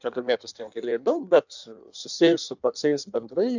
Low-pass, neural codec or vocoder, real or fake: 7.2 kHz; codec, 16 kHz in and 24 kHz out, 1.1 kbps, FireRedTTS-2 codec; fake